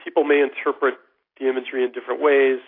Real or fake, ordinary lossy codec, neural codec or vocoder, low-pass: real; AAC, 32 kbps; none; 5.4 kHz